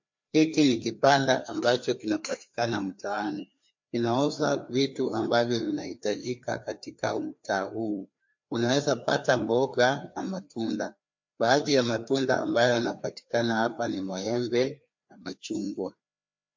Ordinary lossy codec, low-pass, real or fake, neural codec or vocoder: MP3, 48 kbps; 7.2 kHz; fake; codec, 16 kHz, 2 kbps, FreqCodec, larger model